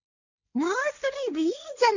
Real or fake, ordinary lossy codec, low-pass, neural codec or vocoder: fake; none; 7.2 kHz; codec, 16 kHz, 1.1 kbps, Voila-Tokenizer